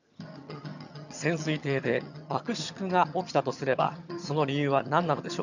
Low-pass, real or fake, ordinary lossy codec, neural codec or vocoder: 7.2 kHz; fake; none; vocoder, 22.05 kHz, 80 mel bands, HiFi-GAN